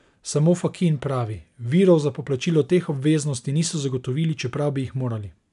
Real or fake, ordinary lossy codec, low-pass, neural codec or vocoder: real; none; 10.8 kHz; none